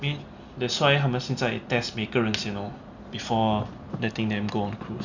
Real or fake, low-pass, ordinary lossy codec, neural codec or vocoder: real; 7.2 kHz; Opus, 64 kbps; none